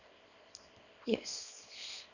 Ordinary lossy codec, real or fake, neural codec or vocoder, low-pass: none; fake; codec, 24 kHz, 0.9 kbps, WavTokenizer, small release; 7.2 kHz